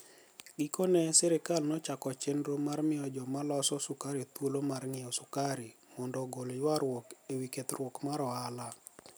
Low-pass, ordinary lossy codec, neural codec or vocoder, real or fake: none; none; none; real